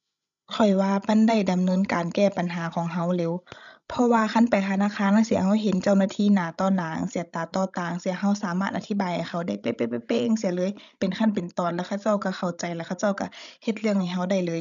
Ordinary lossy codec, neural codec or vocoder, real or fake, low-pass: none; codec, 16 kHz, 16 kbps, FreqCodec, larger model; fake; 7.2 kHz